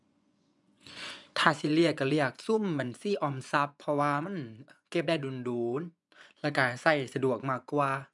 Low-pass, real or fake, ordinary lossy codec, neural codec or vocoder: 10.8 kHz; fake; none; vocoder, 24 kHz, 100 mel bands, Vocos